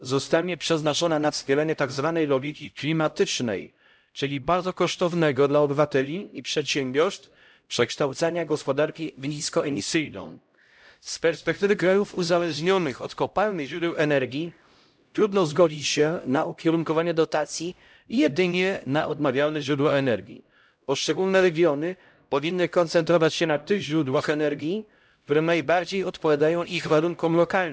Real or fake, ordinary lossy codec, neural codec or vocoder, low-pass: fake; none; codec, 16 kHz, 0.5 kbps, X-Codec, HuBERT features, trained on LibriSpeech; none